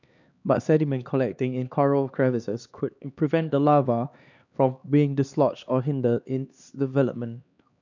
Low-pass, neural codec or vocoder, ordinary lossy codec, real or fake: 7.2 kHz; codec, 16 kHz, 2 kbps, X-Codec, HuBERT features, trained on LibriSpeech; none; fake